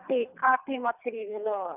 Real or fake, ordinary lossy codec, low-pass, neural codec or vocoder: fake; none; 3.6 kHz; codec, 24 kHz, 3 kbps, HILCodec